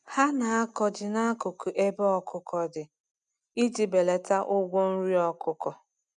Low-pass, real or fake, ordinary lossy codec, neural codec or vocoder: 9.9 kHz; real; none; none